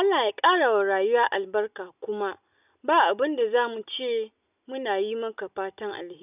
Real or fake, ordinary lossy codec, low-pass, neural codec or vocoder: real; none; 3.6 kHz; none